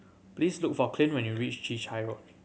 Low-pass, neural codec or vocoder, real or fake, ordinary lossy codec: none; none; real; none